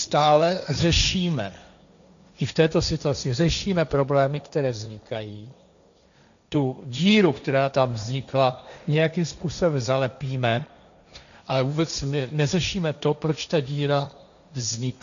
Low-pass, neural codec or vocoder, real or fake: 7.2 kHz; codec, 16 kHz, 1.1 kbps, Voila-Tokenizer; fake